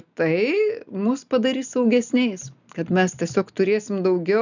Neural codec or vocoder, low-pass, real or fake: none; 7.2 kHz; real